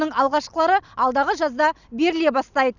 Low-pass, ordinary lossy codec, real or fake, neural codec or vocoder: 7.2 kHz; none; real; none